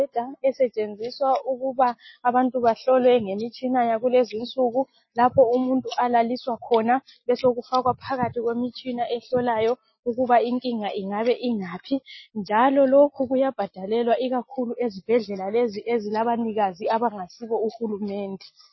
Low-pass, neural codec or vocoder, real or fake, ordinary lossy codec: 7.2 kHz; none; real; MP3, 24 kbps